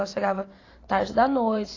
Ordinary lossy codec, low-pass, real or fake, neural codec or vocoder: AAC, 32 kbps; 7.2 kHz; fake; vocoder, 44.1 kHz, 128 mel bands every 512 samples, BigVGAN v2